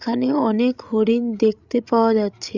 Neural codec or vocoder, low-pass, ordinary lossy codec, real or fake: codec, 16 kHz, 16 kbps, FreqCodec, larger model; 7.2 kHz; Opus, 64 kbps; fake